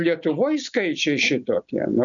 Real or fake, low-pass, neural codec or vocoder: real; 7.2 kHz; none